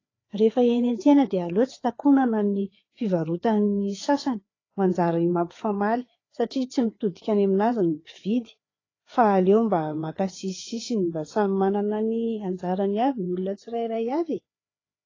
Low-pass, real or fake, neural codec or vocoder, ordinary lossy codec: 7.2 kHz; fake; codec, 16 kHz, 4 kbps, FreqCodec, larger model; AAC, 32 kbps